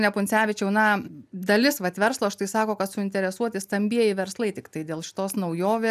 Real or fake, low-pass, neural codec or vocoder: real; 14.4 kHz; none